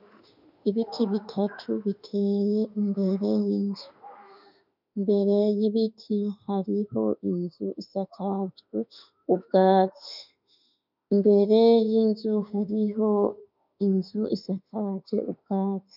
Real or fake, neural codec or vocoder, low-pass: fake; autoencoder, 48 kHz, 32 numbers a frame, DAC-VAE, trained on Japanese speech; 5.4 kHz